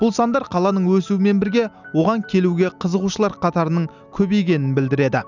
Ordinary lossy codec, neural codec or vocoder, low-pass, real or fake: none; none; 7.2 kHz; real